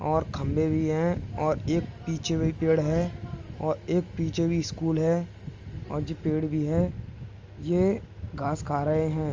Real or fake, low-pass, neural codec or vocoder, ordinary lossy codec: real; 7.2 kHz; none; Opus, 32 kbps